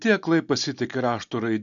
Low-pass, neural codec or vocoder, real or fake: 7.2 kHz; none; real